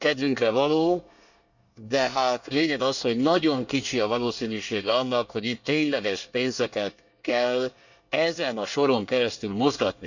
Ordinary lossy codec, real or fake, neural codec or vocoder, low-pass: none; fake; codec, 24 kHz, 1 kbps, SNAC; 7.2 kHz